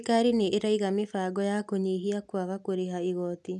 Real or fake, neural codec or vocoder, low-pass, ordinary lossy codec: real; none; none; none